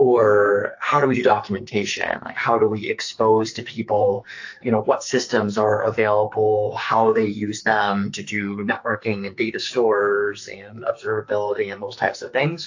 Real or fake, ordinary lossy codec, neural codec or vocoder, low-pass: fake; AAC, 48 kbps; codec, 44.1 kHz, 2.6 kbps, SNAC; 7.2 kHz